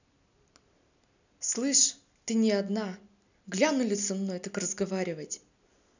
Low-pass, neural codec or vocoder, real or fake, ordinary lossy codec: 7.2 kHz; none; real; none